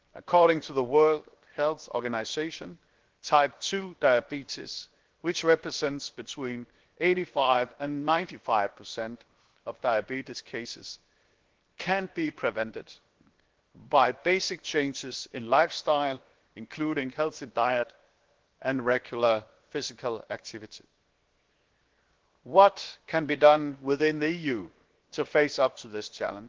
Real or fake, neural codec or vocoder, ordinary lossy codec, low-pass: fake; codec, 16 kHz, 0.7 kbps, FocalCodec; Opus, 16 kbps; 7.2 kHz